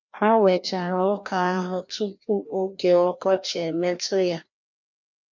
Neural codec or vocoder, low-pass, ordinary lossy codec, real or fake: codec, 16 kHz, 1 kbps, FreqCodec, larger model; 7.2 kHz; none; fake